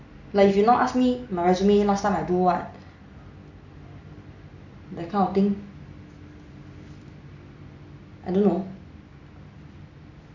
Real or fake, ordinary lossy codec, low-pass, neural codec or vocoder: real; none; 7.2 kHz; none